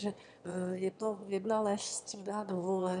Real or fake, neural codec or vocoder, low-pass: fake; autoencoder, 22.05 kHz, a latent of 192 numbers a frame, VITS, trained on one speaker; 9.9 kHz